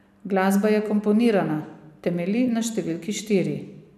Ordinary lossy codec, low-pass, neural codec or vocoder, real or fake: none; 14.4 kHz; vocoder, 44.1 kHz, 128 mel bands every 256 samples, BigVGAN v2; fake